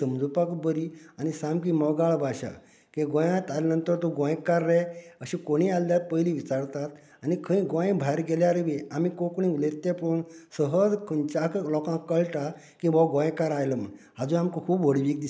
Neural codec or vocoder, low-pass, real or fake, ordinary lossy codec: none; none; real; none